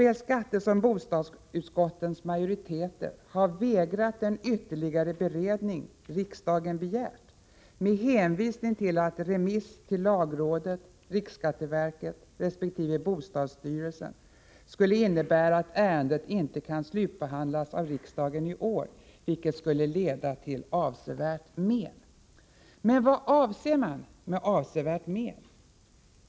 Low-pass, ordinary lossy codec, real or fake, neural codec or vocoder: none; none; real; none